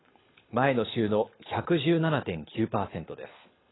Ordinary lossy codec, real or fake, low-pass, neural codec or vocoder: AAC, 16 kbps; fake; 7.2 kHz; codec, 24 kHz, 6 kbps, HILCodec